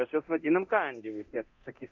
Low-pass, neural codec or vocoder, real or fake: 7.2 kHz; codec, 24 kHz, 0.9 kbps, DualCodec; fake